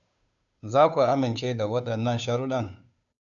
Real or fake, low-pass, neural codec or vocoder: fake; 7.2 kHz; codec, 16 kHz, 2 kbps, FunCodec, trained on Chinese and English, 25 frames a second